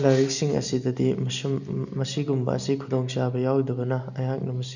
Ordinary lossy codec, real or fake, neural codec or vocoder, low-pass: none; real; none; 7.2 kHz